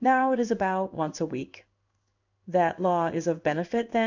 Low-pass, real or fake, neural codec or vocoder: 7.2 kHz; fake; codec, 16 kHz in and 24 kHz out, 1 kbps, XY-Tokenizer